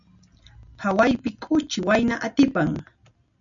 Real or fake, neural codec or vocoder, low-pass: real; none; 7.2 kHz